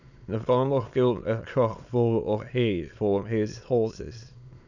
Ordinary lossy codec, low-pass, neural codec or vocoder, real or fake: none; 7.2 kHz; autoencoder, 22.05 kHz, a latent of 192 numbers a frame, VITS, trained on many speakers; fake